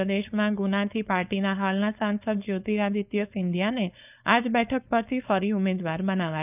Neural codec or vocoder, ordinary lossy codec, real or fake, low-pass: codec, 16 kHz, 4.8 kbps, FACodec; none; fake; 3.6 kHz